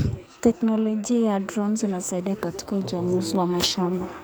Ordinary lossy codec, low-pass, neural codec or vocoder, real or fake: none; none; codec, 44.1 kHz, 2.6 kbps, SNAC; fake